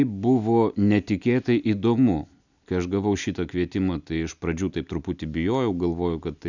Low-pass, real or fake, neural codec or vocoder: 7.2 kHz; real; none